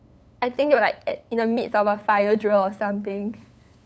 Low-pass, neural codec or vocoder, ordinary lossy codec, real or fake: none; codec, 16 kHz, 8 kbps, FunCodec, trained on LibriTTS, 25 frames a second; none; fake